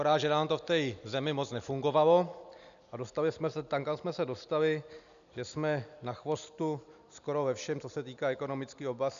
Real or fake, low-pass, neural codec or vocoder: real; 7.2 kHz; none